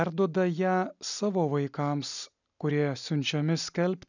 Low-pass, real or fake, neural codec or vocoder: 7.2 kHz; real; none